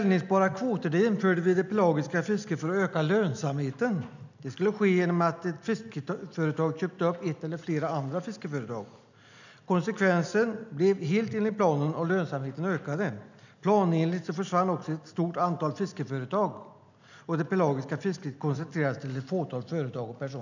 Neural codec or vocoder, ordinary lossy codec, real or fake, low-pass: none; none; real; 7.2 kHz